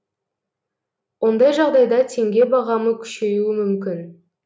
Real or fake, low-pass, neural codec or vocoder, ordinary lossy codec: real; none; none; none